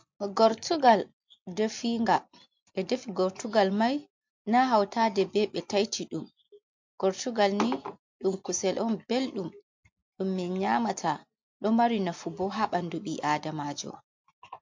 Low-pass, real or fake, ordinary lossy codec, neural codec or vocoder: 7.2 kHz; real; MP3, 48 kbps; none